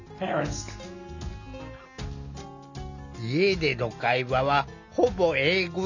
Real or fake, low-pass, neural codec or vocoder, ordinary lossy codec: real; 7.2 kHz; none; none